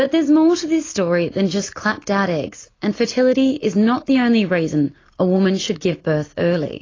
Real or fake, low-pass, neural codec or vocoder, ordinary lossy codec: real; 7.2 kHz; none; AAC, 32 kbps